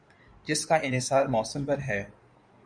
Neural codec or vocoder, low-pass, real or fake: codec, 16 kHz in and 24 kHz out, 2.2 kbps, FireRedTTS-2 codec; 9.9 kHz; fake